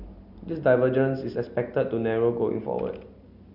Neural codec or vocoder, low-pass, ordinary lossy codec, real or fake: none; 5.4 kHz; none; real